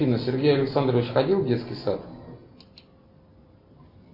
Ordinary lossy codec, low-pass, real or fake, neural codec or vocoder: MP3, 32 kbps; 5.4 kHz; real; none